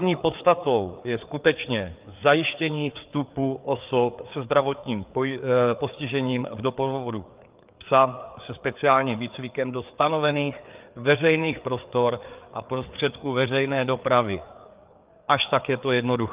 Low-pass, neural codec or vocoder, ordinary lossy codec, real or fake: 3.6 kHz; codec, 16 kHz, 4 kbps, FreqCodec, larger model; Opus, 32 kbps; fake